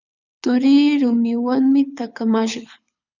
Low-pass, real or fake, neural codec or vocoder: 7.2 kHz; fake; codec, 24 kHz, 6 kbps, HILCodec